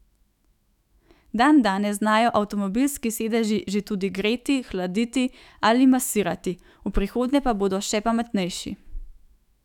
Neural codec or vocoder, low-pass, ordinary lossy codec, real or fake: autoencoder, 48 kHz, 128 numbers a frame, DAC-VAE, trained on Japanese speech; 19.8 kHz; none; fake